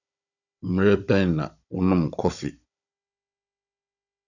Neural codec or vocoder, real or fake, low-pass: codec, 16 kHz, 16 kbps, FunCodec, trained on Chinese and English, 50 frames a second; fake; 7.2 kHz